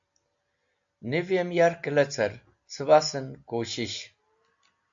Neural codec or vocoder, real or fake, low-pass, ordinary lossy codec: none; real; 7.2 kHz; AAC, 64 kbps